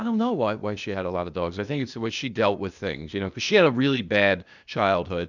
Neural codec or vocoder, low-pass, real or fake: codec, 16 kHz, 0.8 kbps, ZipCodec; 7.2 kHz; fake